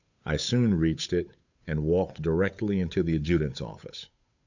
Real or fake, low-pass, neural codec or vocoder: fake; 7.2 kHz; codec, 16 kHz, 8 kbps, FunCodec, trained on Chinese and English, 25 frames a second